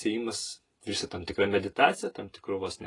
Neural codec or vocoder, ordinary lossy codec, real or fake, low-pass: none; AAC, 32 kbps; real; 10.8 kHz